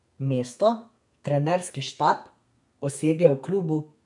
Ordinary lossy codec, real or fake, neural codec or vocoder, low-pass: none; fake; codec, 32 kHz, 1.9 kbps, SNAC; 10.8 kHz